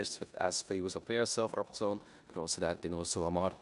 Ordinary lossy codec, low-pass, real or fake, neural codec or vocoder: none; 10.8 kHz; fake; codec, 16 kHz in and 24 kHz out, 0.9 kbps, LongCat-Audio-Codec, four codebook decoder